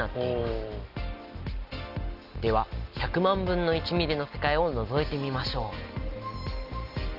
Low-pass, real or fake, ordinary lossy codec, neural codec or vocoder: 5.4 kHz; real; Opus, 32 kbps; none